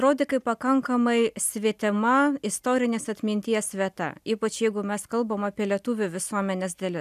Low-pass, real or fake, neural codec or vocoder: 14.4 kHz; real; none